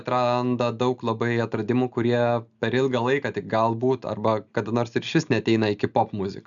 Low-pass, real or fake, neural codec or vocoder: 7.2 kHz; real; none